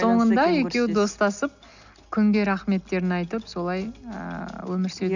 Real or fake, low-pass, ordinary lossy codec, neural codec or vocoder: real; 7.2 kHz; none; none